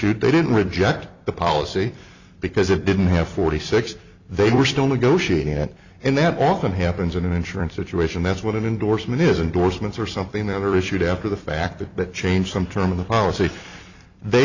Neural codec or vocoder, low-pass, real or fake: vocoder, 44.1 kHz, 128 mel bands every 256 samples, BigVGAN v2; 7.2 kHz; fake